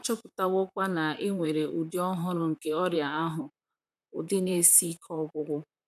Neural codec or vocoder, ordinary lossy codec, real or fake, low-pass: vocoder, 44.1 kHz, 128 mel bands, Pupu-Vocoder; none; fake; 14.4 kHz